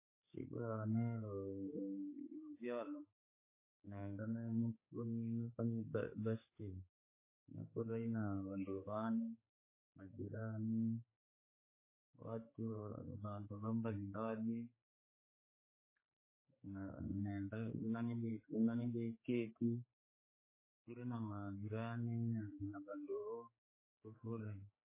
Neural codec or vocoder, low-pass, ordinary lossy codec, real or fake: codec, 16 kHz, 4 kbps, X-Codec, HuBERT features, trained on general audio; 3.6 kHz; MP3, 16 kbps; fake